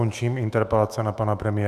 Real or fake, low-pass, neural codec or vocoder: fake; 14.4 kHz; autoencoder, 48 kHz, 128 numbers a frame, DAC-VAE, trained on Japanese speech